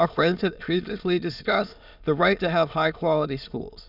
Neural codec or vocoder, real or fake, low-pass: autoencoder, 22.05 kHz, a latent of 192 numbers a frame, VITS, trained on many speakers; fake; 5.4 kHz